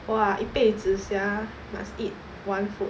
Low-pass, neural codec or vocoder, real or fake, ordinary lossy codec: none; none; real; none